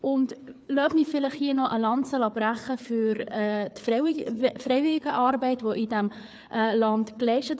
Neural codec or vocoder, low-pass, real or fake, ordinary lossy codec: codec, 16 kHz, 4 kbps, FreqCodec, larger model; none; fake; none